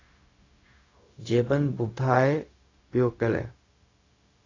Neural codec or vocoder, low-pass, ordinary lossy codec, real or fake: codec, 16 kHz, 0.4 kbps, LongCat-Audio-Codec; 7.2 kHz; AAC, 32 kbps; fake